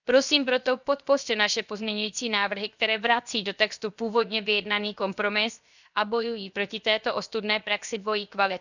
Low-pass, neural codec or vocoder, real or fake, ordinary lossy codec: 7.2 kHz; codec, 16 kHz, 0.7 kbps, FocalCodec; fake; none